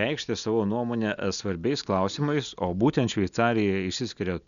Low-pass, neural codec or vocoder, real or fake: 7.2 kHz; none; real